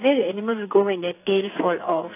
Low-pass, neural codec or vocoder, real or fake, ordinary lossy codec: 3.6 kHz; codec, 44.1 kHz, 2.6 kbps, SNAC; fake; AAC, 24 kbps